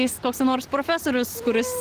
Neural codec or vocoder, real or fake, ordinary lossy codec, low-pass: none; real; Opus, 16 kbps; 14.4 kHz